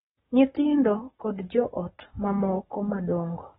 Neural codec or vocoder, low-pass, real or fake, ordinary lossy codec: codec, 44.1 kHz, 7.8 kbps, Pupu-Codec; 19.8 kHz; fake; AAC, 16 kbps